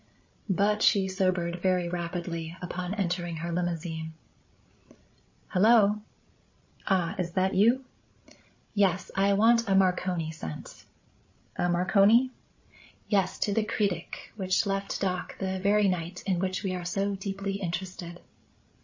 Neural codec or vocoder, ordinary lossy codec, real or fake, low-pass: codec, 16 kHz, 16 kbps, FreqCodec, larger model; MP3, 32 kbps; fake; 7.2 kHz